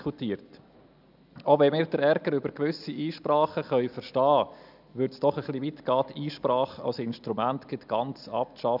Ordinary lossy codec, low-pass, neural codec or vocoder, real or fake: none; 5.4 kHz; none; real